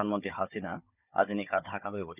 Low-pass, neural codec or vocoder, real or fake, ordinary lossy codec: 3.6 kHz; codec, 16 kHz, 16 kbps, FunCodec, trained on Chinese and English, 50 frames a second; fake; none